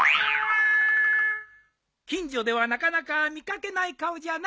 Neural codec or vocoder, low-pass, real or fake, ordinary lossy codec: none; none; real; none